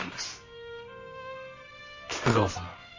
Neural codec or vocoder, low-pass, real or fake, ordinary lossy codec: codec, 24 kHz, 0.9 kbps, WavTokenizer, medium music audio release; 7.2 kHz; fake; MP3, 32 kbps